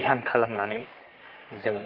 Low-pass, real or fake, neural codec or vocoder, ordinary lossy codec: 5.4 kHz; fake; codec, 44.1 kHz, 3.4 kbps, Pupu-Codec; Opus, 32 kbps